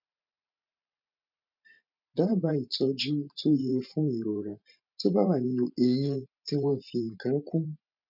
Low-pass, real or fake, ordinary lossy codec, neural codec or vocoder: 5.4 kHz; fake; none; vocoder, 44.1 kHz, 128 mel bands every 512 samples, BigVGAN v2